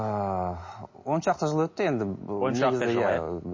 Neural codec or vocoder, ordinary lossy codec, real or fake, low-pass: none; MP3, 32 kbps; real; 7.2 kHz